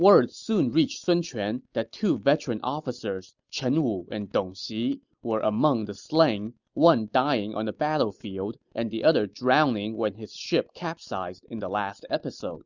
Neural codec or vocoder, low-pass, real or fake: none; 7.2 kHz; real